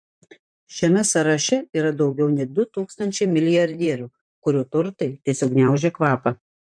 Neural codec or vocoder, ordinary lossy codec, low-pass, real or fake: vocoder, 44.1 kHz, 128 mel bands, Pupu-Vocoder; MP3, 64 kbps; 9.9 kHz; fake